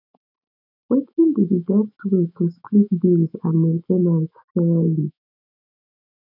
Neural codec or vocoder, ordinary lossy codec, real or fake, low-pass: autoencoder, 48 kHz, 128 numbers a frame, DAC-VAE, trained on Japanese speech; none; fake; 5.4 kHz